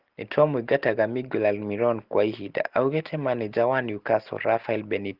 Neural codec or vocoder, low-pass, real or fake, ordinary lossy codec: none; 5.4 kHz; real; Opus, 16 kbps